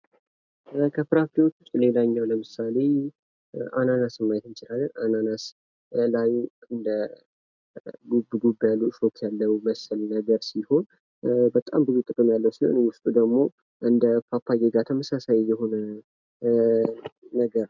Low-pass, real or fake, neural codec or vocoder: 7.2 kHz; real; none